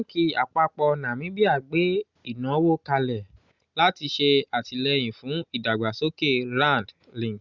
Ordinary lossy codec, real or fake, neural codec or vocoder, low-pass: Opus, 64 kbps; real; none; 7.2 kHz